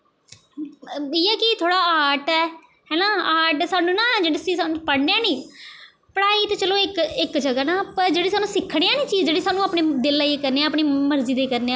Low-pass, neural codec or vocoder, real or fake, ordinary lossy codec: none; none; real; none